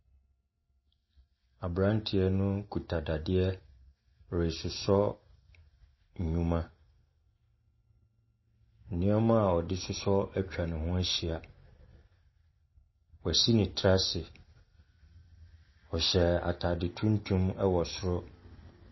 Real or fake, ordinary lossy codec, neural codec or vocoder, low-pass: real; MP3, 24 kbps; none; 7.2 kHz